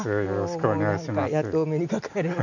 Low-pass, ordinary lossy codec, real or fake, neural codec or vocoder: 7.2 kHz; none; real; none